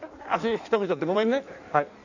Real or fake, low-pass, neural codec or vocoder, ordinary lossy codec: fake; 7.2 kHz; codec, 16 kHz in and 24 kHz out, 1.1 kbps, FireRedTTS-2 codec; none